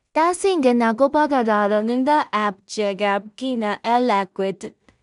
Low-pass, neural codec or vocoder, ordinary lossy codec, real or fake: 10.8 kHz; codec, 16 kHz in and 24 kHz out, 0.4 kbps, LongCat-Audio-Codec, two codebook decoder; none; fake